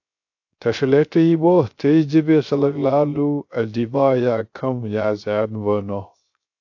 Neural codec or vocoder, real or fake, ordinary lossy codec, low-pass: codec, 16 kHz, 0.3 kbps, FocalCodec; fake; AAC, 48 kbps; 7.2 kHz